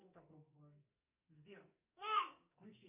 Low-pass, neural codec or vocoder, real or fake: 3.6 kHz; codec, 44.1 kHz, 2.6 kbps, SNAC; fake